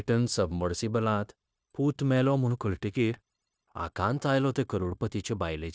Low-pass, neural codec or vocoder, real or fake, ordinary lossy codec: none; codec, 16 kHz, 0.9 kbps, LongCat-Audio-Codec; fake; none